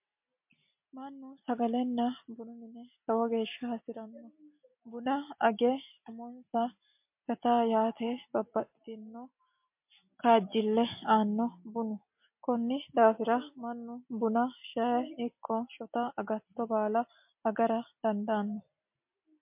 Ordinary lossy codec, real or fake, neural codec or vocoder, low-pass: MP3, 32 kbps; real; none; 3.6 kHz